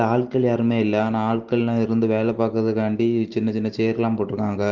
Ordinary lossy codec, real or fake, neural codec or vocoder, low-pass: Opus, 16 kbps; real; none; 7.2 kHz